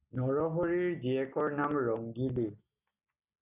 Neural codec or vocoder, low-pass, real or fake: none; 3.6 kHz; real